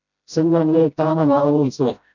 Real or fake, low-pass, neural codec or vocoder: fake; 7.2 kHz; codec, 16 kHz, 0.5 kbps, FreqCodec, smaller model